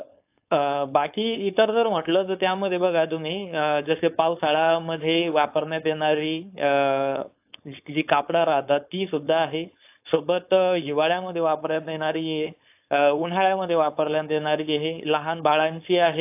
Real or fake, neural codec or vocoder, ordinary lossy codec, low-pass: fake; codec, 16 kHz, 4.8 kbps, FACodec; AAC, 32 kbps; 3.6 kHz